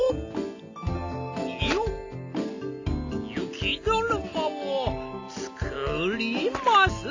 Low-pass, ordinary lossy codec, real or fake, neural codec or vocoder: 7.2 kHz; AAC, 48 kbps; real; none